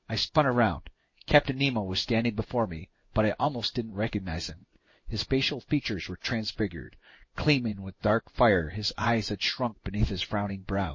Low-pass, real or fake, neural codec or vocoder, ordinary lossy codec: 7.2 kHz; fake; codec, 16 kHz in and 24 kHz out, 1 kbps, XY-Tokenizer; MP3, 32 kbps